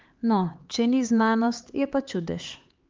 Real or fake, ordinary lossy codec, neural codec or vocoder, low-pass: fake; Opus, 32 kbps; codec, 16 kHz, 4 kbps, X-Codec, HuBERT features, trained on LibriSpeech; 7.2 kHz